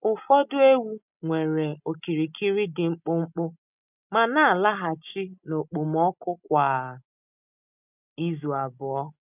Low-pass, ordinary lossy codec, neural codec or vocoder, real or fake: 3.6 kHz; none; none; real